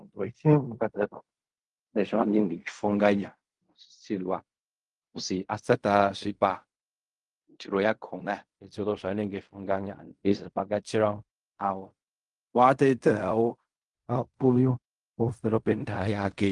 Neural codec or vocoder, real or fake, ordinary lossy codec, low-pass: codec, 16 kHz in and 24 kHz out, 0.4 kbps, LongCat-Audio-Codec, fine tuned four codebook decoder; fake; Opus, 32 kbps; 10.8 kHz